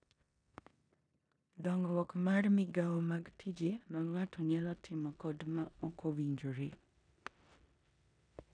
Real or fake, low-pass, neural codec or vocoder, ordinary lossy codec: fake; 9.9 kHz; codec, 16 kHz in and 24 kHz out, 0.9 kbps, LongCat-Audio-Codec, four codebook decoder; none